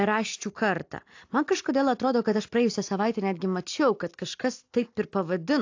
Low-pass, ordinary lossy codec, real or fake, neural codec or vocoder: 7.2 kHz; AAC, 48 kbps; real; none